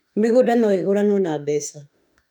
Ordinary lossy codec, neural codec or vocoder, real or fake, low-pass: none; autoencoder, 48 kHz, 32 numbers a frame, DAC-VAE, trained on Japanese speech; fake; 19.8 kHz